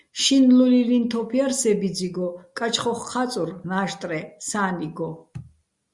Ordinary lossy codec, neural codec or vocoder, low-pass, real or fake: Opus, 64 kbps; none; 10.8 kHz; real